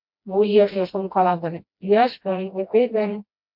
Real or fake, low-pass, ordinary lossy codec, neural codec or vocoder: fake; 5.4 kHz; MP3, 48 kbps; codec, 16 kHz, 1 kbps, FreqCodec, smaller model